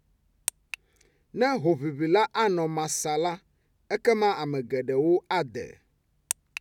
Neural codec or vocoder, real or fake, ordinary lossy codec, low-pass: none; real; none; 19.8 kHz